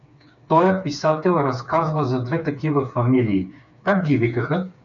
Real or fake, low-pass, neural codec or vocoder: fake; 7.2 kHz; codec, 16 kHz, 4 kbps, FreqCodec, smaller model